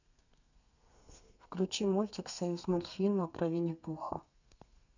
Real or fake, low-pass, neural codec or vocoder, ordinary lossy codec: fake; 7.2 kHz; codec, 32 kHz, 1.9 kbps, SNAC; none